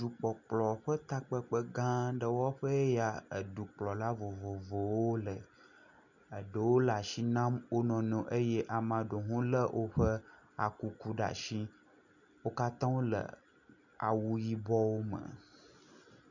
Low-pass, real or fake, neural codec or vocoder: 7.2 kHz; real; none